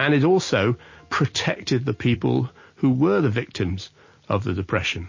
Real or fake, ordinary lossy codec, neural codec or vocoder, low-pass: real; MP3, 32 kbps; none; 7.2 kHz